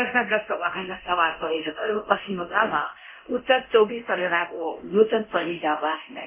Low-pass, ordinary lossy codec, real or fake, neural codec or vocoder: 3.6 kHz; MP3, 24 kbps; fake; codec, 24 kHz, 0.9 kbps, DualCodec